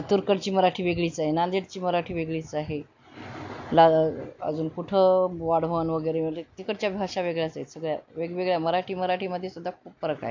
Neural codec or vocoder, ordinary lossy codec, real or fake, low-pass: none; MP3, 48 kbps; real; 7.2 kHz